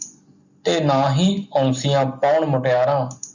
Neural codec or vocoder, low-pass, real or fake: none; 7.2 kHz; real